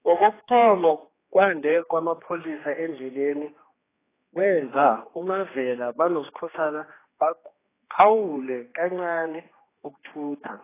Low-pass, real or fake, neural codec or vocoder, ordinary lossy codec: 3.6 kHz; fake; codec, 16 kHz, 2 kbps, X-Codec, HuBERT features, trained on general audio; AAC, 16 kbps